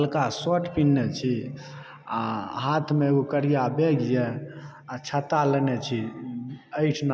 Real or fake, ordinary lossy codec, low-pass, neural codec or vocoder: real; none; none; none